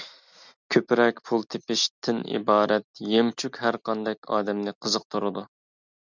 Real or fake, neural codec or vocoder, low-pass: real; none; 7.2 kHz